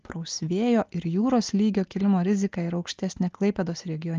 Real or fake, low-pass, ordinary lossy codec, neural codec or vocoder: real; 7.2 kHz; Opus, 32 kbps; none